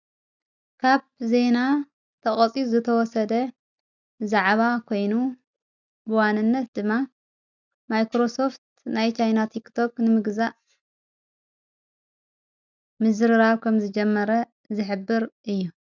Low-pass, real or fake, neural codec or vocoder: 7.2 kHz; real; none